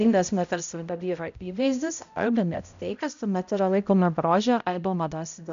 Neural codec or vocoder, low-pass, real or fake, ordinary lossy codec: codec, 16 kHz, 0.5 kbps, X-Codec, HuBERT features, trained on balanced general audio; 7.2 kHz; fake; AAC, 96 kbps